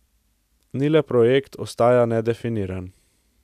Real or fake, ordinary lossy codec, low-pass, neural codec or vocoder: real; none; 14.4 kHz; none